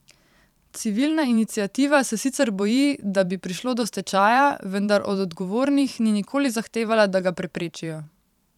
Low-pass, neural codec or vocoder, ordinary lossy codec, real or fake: 19.8 kHz; vocoder, 44.1 kHz, 128 mel bands every 512 samples, BigVGAN v2; none; fake